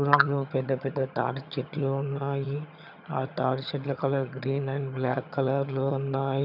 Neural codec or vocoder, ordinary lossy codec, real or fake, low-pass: vocoder, 22.05 kHz, 80 mel bands, HiFi-GAN; none; fake; 5.4 kHz